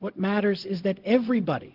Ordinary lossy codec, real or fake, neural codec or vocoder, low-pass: Opus, 16 kbps; fake; codec, 16 kHz, 0.4 kbps, LongCat-Audio-Codec; 5.4 kHz